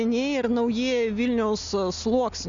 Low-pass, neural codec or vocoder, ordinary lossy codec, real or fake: 7.2 kHz; none; MP3, 64 kbps; real